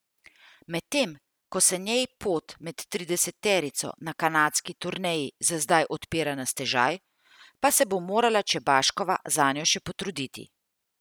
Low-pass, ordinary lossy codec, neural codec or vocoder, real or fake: none; none; none; real